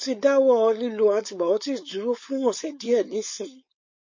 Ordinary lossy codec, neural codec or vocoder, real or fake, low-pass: MP3, 32 kbps; codec, 16 kHz, 4.8 kbps, FACodec; fake; 7.2 kHz